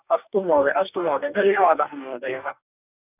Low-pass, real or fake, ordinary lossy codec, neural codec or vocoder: 3.6 kHz; fake; none; codec, 44.1 kHz, 2.6 kbps, DAC